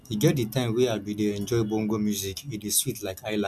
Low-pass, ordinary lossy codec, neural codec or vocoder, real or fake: 14.4 kHz; none; none; real